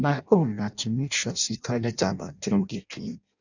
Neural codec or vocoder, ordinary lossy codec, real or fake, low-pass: codec, 16 kHz in and 24 kHz out, 0.6 kbps, FireRedTTS-2 codec; none; fake; 7.2 kHz